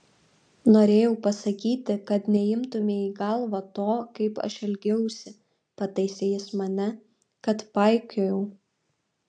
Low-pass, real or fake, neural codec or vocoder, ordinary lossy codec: 9.9 kHz; real; none; AAC, 64 kbps